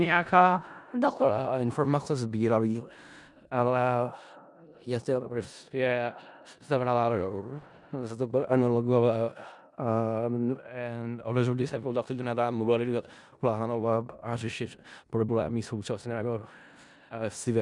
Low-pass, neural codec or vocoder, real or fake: 10.8 kHz; codec, 16 kHz in and 24 kHz out, 0.4 kbps, LongCat-Audio-Codec, four codebook decoder; fake